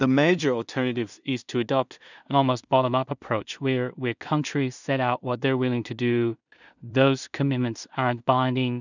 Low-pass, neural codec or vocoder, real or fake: 7.2 kHz; codec, 16 kHz in and 24 kHz out, 0.4 kbps, LongCat-Audio-Codec, two codebook decoder; fake